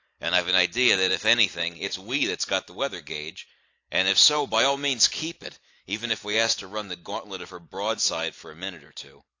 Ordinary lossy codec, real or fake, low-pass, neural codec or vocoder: AAC, 48 kbps; real; 7.2 kHz; none